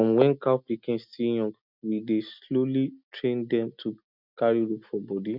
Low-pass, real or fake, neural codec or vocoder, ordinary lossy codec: 5.4 kHz; real; none; none